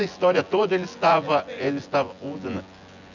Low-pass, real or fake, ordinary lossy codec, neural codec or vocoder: 7.2 kHz; fake; none; vocoder, 24 kHz, 100 mel bands, Vocos